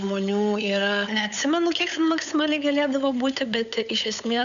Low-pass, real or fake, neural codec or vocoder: 7.2 kHz; fake; codec, 16 kHz, 8 kbps, FunCodec, trained on LibriTTS, 25 frames a second